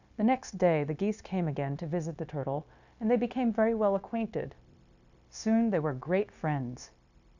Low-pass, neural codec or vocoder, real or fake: 7.2 kHz; codec, 16 kHz, 0.9 kbps, LongCat-Audio-Codec; fake